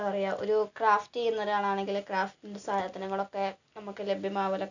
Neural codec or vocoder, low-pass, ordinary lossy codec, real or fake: none; 7.2 kHz; none; real